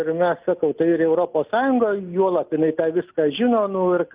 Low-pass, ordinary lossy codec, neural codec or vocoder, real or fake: 3.6 kHz; Opus, 24 kbps; none; real